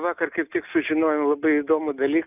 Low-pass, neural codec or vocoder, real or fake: 3.6 kHz; none; real